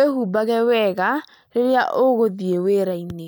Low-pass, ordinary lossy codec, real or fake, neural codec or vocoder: none; none; real; none